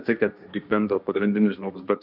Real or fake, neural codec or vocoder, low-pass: fake; codec, 16 kHz, 1.1 kbps, Voila-Tokenizer; 5.4 kHz